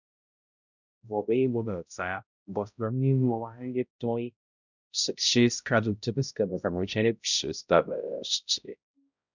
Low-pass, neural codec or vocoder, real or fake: 7.2 kHz; codec, 16 kHz, 0.5 kbps, X-Codec, HuBERT features, trained on balanced general audio; fake